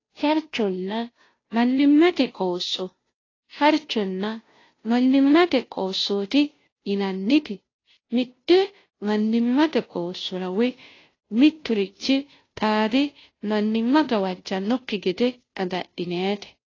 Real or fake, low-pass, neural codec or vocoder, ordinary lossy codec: fake; 7.2 kHz; codec, 16 kHz, 0.5 kbps, FunCodec, trained on Chinese and English, 25 frames a second; AAC, 32 kbps